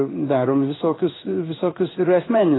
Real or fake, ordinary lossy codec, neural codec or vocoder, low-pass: fake; AAC, 16 kbps; codec, 16 kHz in and 24 kHz out, 1 kbps, XY-Tokenizer; 7.2 kHz